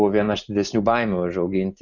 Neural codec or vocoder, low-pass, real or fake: none; 7.2 kHz; real